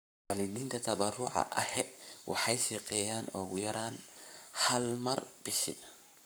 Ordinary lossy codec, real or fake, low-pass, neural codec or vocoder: none; fake; none; codec, 44.1 kHz, 7.8 kbps, Pupu-Codec